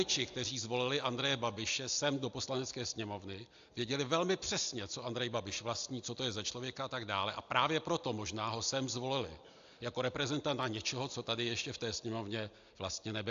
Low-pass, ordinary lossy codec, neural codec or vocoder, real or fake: 7.2 kHz; AAC, 64 kbps; none; real